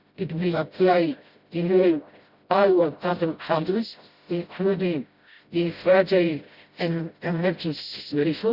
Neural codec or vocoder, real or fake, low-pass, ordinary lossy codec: codec, 16 kHz, 0.5 kbps, FreqCodec, smaller model; fake; 5.4 kHz; Opus, 64 kbps